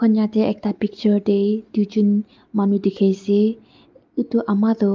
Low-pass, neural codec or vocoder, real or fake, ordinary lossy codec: 7.2 kHz; none; real; Opus, 24 kbps